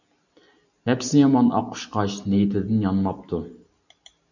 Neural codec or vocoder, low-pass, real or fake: none; 7.2 kHz; real